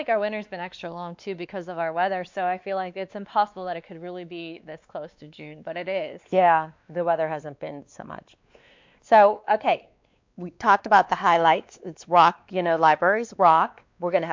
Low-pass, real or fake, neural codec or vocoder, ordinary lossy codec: 7.2 kHz; fake; codec, 16 kHz, 2 kbps, X-Codec, WavLM features, trained on Multilingual LibriSpeech; MP3, 64 kbps